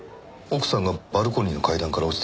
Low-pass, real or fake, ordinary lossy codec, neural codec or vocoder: none; real; none; none